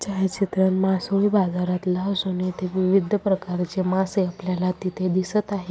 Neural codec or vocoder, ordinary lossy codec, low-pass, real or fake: none; none; none; real